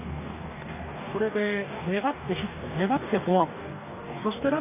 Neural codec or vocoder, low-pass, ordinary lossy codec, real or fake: codec, 44.1 kHz, 2.6 kbps, DAC; 3.6 kHz; none; fake